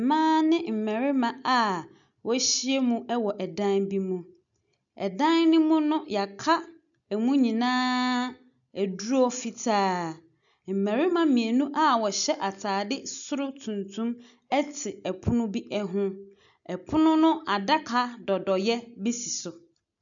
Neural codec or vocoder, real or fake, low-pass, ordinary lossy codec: none; real; 7.2 kHz; MP3, 96 kbps